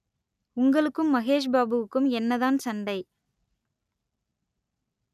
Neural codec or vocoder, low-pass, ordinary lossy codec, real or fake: none; 14.4 kHz; none; real